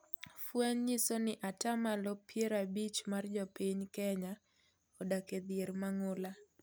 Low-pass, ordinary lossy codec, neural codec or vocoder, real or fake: none; none; none; real